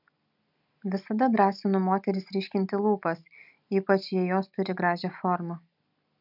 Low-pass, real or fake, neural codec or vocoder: 5.4 kHz; real; none